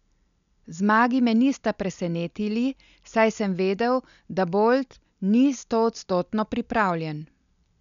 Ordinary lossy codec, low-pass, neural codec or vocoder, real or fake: none; 7.2 kHz; none; real